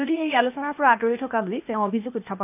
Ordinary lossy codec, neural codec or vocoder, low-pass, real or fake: none; codec, 16 kHz, 0.8 kbps, ZipCodec; 3.6 kHz; fake